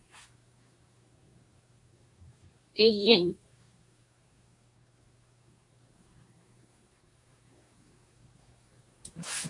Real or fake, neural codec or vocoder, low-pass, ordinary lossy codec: fake; codec, 24 kHz, 1 kbps, SNAC; 10.8 kHz; MP3, 96 kbps